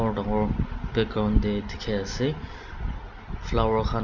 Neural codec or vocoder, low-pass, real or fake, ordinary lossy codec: none; 7.2 kHz; real; none